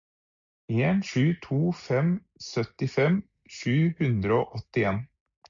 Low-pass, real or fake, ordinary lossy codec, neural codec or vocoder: 7.2 kHz; real; MP3, 64 kbps; none